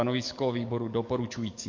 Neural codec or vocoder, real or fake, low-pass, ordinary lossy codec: codec, 44.1 kHz, 7.8 kbps, DAC; fake; 7.2 kHz; MP3, 48 kbps